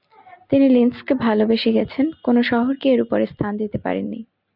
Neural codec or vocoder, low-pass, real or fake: none; 5.4 kHz; real